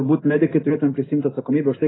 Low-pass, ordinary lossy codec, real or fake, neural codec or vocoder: 7.2 kHz; AAC, 16 kbps; real; none